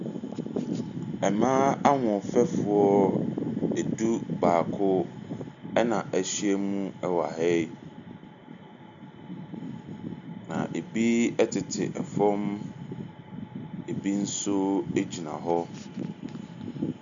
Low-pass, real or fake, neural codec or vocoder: 7.2 kHz; real; none